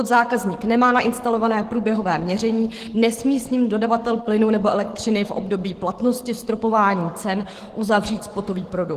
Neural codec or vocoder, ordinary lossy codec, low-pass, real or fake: codec, 44.1 kHz, 7.8 kbps, DAC; Opus, 16 kbps; 14.4 kHz; fake